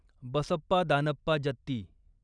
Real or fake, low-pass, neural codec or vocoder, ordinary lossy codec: real; 9.9 kHz; none; none